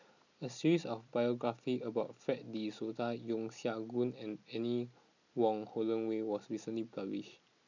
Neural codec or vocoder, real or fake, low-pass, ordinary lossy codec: none; real; 7.2 kHz; none